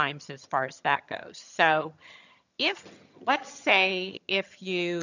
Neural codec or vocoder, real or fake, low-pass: vocoder, 22.05 kHz, 80 mel bands, HiFi-GAN; fake; 7.2 kHz